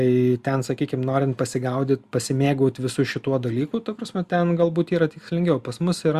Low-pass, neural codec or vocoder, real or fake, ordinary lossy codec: 14.4 kHz; none; real; Opus, 64 kbps